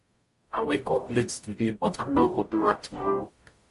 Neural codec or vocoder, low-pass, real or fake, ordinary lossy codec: codec, 44.1 kHz, 0.9 kbps, DAC; 14.4 kHz; fake; MP3, 48 kbps